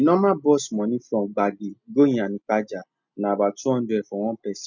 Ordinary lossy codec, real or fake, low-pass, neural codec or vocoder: none; real; 7.2 kHz; none